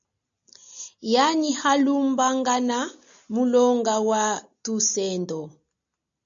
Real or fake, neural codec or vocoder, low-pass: real; none; 7.2 kHz